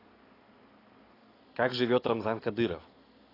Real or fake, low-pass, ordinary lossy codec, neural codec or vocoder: real; 5.4 kHz; AAC, 24 kbps; none